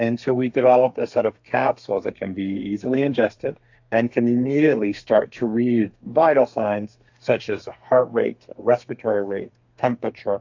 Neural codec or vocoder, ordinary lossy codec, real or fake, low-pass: codec, 32 kHz, 1.9 kbps, SNAC; AAC, 48 kbps; fake; 7.2 kHz